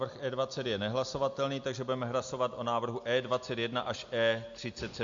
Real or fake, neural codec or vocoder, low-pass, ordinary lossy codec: real; none; 7.2 kHz; MP3, 48 kbps